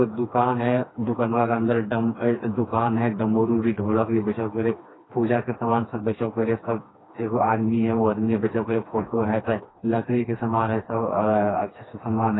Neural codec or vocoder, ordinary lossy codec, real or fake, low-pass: codec, 16 kHz, 2 kbps, FreqCodec, smaller model; AAC, 16 kbps; fake; 7.2 kHz